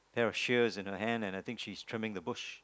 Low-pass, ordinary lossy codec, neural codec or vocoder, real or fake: none; none; none; real